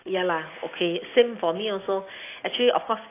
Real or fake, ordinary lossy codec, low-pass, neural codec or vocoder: real; AAC, 24 kbps; 3.6 kHz; none